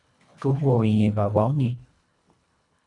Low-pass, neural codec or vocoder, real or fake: 10.8 kHz; codec, 24 kHz, 1.5 kbps, HILCodec; fake